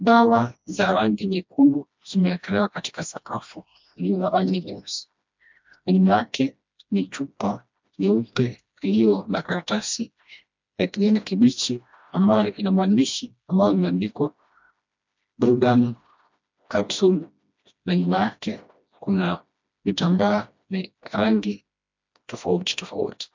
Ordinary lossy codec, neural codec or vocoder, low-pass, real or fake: MP3, 64 kbps; codec, 16 kHz, 1 kbps, FreqCodec, smaller model; 7.2 kHz; fake